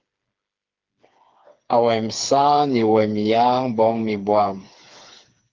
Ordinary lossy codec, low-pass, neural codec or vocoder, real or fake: Opus, 32 kbps; 7.2 kHz; codec, 16 kHz, 4 kbps, FreqCodec, smaller model; fake